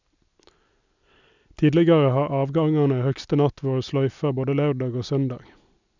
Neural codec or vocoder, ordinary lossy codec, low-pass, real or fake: none; none; 7.2 kHz; real